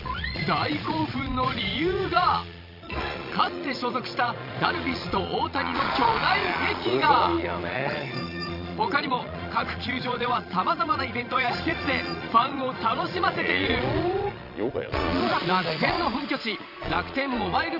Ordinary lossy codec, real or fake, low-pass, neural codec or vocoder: none; fake; 5.4 kHz; vocoder, 22.05 kHz, 80 mel bands, Vocos